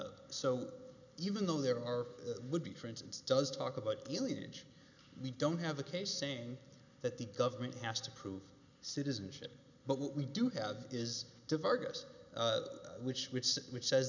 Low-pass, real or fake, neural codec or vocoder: 7.2 kHz; real; none